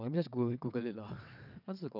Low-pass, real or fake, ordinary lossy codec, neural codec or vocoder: 5.4 kHz; fake; none; vocoder, 22.05 kHz, 80 mel bands, WaveNeXt